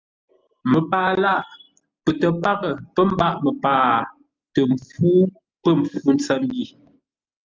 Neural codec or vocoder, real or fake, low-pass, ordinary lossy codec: none; real; 7.2 kHz; Opus, 24 kbps